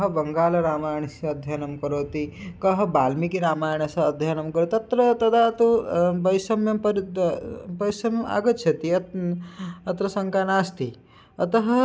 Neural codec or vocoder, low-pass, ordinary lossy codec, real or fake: none; none; none; real